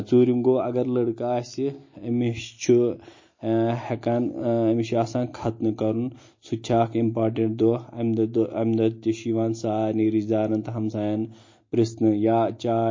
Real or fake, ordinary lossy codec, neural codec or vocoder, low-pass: real; MP3, 32 kbps; none; 7.2 kHz